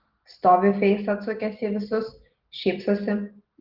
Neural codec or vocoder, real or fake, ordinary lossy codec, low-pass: none; real; Opus, 16 kbps; 5.4 kHz